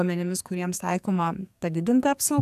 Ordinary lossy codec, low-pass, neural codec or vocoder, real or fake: AAC, 96 kbps; 14.4 kHz; codec, 44.1 kHz, 2.6 kbps, SNAC; fake